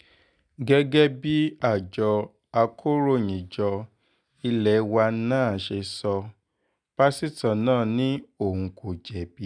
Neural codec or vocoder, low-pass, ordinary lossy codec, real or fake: none; 9.9 kHz; none; real